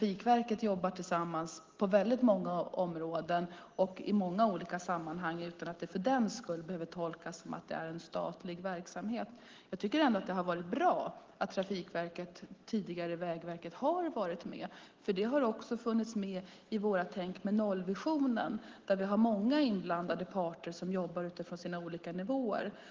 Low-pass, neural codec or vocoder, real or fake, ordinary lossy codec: 7.2 kHz; none; real; Opus, 16 kbps